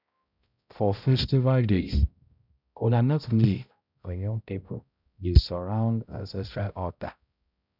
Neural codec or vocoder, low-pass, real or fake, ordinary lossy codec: codec, 16 kHz, 0.5 kbps, X-Codec, HuBERT features, trained on balanced general audio; 5.4 kHz; fake; none